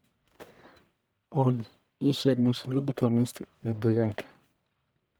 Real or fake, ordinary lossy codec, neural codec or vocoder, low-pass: fake; none; codec, 44.1 kHz, 1.7 kbps, Pupu-Codec; none